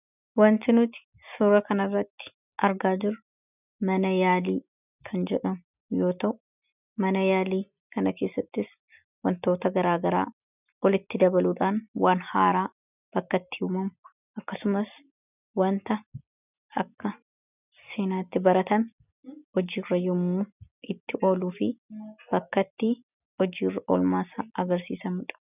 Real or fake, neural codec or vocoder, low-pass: real; none; 3.6 kHz